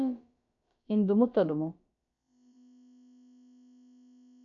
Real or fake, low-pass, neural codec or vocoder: fake; 7.2 kHz; codec, 16 kHz, about 1 kbps, DyCAST, with the encoder's durations